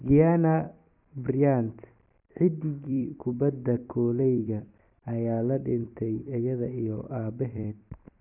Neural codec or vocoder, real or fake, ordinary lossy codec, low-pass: vocoder, 44.1 kHz, 128 mel bands every 256 samples, BigVGAN v2; fake; none; 3.6 kHz